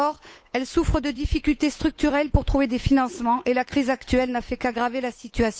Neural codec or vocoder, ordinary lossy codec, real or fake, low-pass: codec, 16 kHz, 8 kbps, FunCodec, trained on Chinese and English, 25 frames a second; none; fake; none